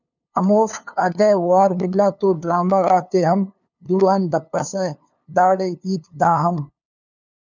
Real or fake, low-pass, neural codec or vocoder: fake; 7.2 kHz; codec, 16 kHz, 2 kbps, FunCodec, trained on LibriTTS, 25 frames a second